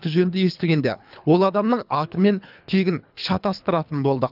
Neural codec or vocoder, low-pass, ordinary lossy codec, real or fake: codec, 24 kHz, 3 kbps, HILCodec; 5.4 kHz; none; fake